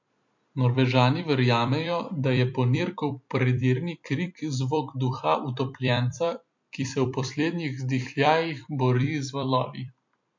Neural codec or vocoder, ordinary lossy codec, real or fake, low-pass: vocoder, 44.1 kHz, 128 mel bands every 256 samples, BigVGAN v2; MP3, 48 kbps; fake; 7.2 kHz